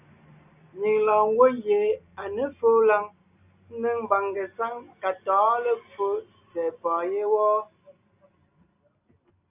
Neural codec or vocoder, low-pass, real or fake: none; 3.6 kHz; real